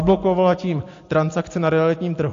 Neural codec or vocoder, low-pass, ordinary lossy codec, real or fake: none; 7.2 kHz; AAC, 48 kbps; real